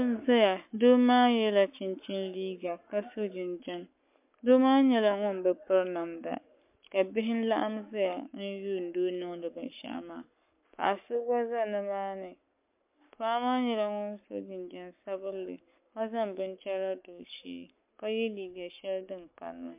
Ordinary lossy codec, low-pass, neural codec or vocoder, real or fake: MP3, 32 kbps; 3.6 kHz; codec, 44.1 kHz, 7.8 kbps, Pupu-Codec; fake